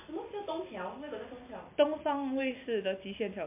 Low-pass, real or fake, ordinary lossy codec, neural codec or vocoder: 3.6 kHz; real; MP3, 24 kbps; none